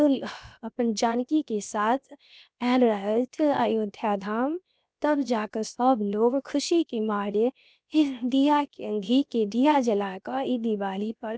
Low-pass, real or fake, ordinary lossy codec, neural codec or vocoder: none; fake; none; codec, 16 kHz, 0.7 kbps, FocalCodec